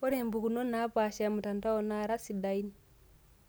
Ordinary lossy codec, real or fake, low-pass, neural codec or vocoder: none; real; none; none